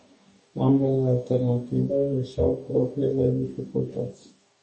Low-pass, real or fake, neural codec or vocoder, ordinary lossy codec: 10.8 kHz; fake; codec, 44.1 kHz, 2.6 kbps, DAC; MP3, 32 kbps